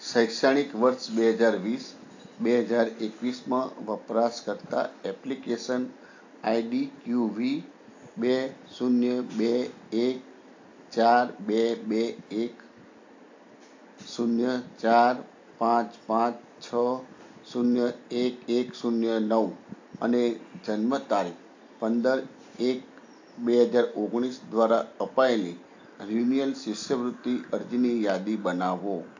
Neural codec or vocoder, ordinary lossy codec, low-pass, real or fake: none; AAC, 48 kbps; 7.2 kHz; real